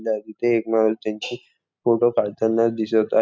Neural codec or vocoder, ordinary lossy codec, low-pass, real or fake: none; none; none; real